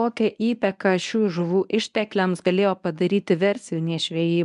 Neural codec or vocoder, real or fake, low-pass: codec, 24 kHz, 0.9 kbps, WavTokenizer, medium speech release version 1; fake; 10.8 kHz